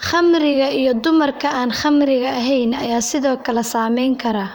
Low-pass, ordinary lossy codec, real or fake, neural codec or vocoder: none; none; fake; vocoder, 44.1 kHz, 128 mel bands, Pupu-Vocoder